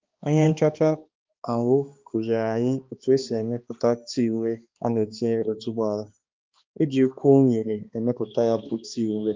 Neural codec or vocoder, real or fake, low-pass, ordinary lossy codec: codec, 16 kHz, 2 kbps, X-Codec, HuBERT features, trained on balanced general audio; fake; 7.2 kHz; Opus, 24 kbps